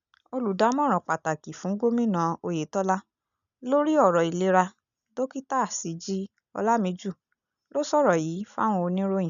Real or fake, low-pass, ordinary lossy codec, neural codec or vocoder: real; 7.2 kHz; none; none